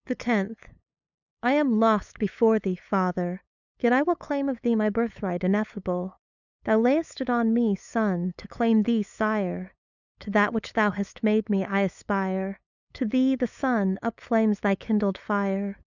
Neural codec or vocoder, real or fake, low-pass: codec, 16 kHz, 8 kbps, FunCodec, trained on LibriTTS, 25 frames a second; fake; 7.2 kHz